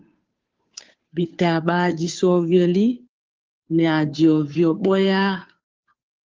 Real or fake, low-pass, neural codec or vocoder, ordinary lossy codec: fake; 7.2 kHz; codec, 16 kHz, 2 kbps, FunCodec, trained on Chinese and English, 25 frames a second; Opus, 32 kbps